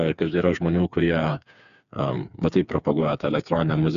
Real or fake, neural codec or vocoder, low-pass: fake; codec, 16 kHz, 4 kbps, FreqCodec, smaller model; 7.2 kHz